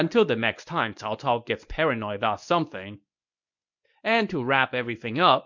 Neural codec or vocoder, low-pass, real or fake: codec, 24 kHz, 0.9 kbps, WavTokenizer, medium speech release version 1; 7.2 kHz; fake